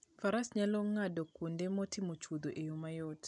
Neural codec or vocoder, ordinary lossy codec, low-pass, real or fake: none; none; 10.8 kHz; real